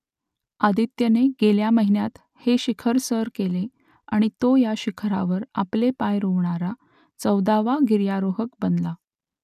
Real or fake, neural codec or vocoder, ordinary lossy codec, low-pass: real; none; none; 14.4 kHz